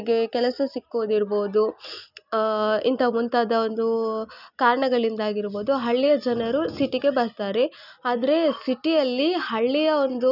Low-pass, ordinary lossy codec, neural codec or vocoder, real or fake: 5.4 kHz; none; none; real